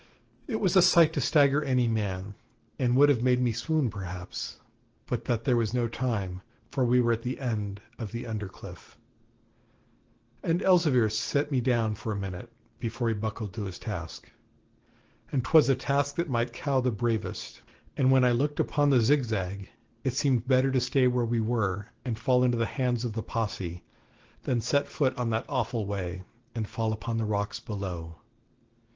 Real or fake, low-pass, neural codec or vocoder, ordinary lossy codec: real; 7.2 kHz; none; Opus, 16 kbps